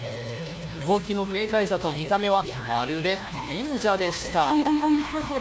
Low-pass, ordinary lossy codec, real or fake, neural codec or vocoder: none; none; fake; codec, 16 kHz, 1 kbps, FunCodec, trained on LibriTTS, 50 frames a second